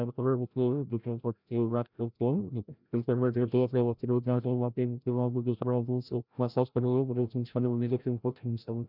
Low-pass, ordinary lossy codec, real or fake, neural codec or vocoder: 5.4 kHz; none; fake; codec, 16 kHz, 0.5 kbps, FreqCodec, larger model